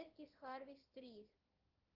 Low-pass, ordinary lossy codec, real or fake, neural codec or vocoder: 5.4 kHz; Opus, 16 kbps; fake; codec, 16 kHz in and 24 kHz out, 1 kbps, XY-Tokenizer